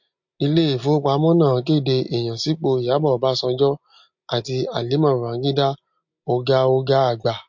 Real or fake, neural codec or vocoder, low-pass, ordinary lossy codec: real; none; 7.2 kHz; MP3, 48 kbps